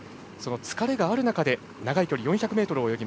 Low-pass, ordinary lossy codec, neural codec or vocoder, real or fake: none; none; none; real